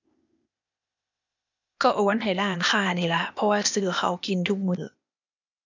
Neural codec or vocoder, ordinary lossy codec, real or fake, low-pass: codec, 16 kHz, 0.8 kbps, ZipCodec; none; fake; 7.2 kHz